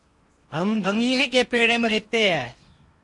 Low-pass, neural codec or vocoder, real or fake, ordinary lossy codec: 10.8 kHz; codec, 16 kHz in and 24 kHz out, 0.8 kbps, FocalCodec, streaming, 65536 codes; fake; MP3, 48 kbps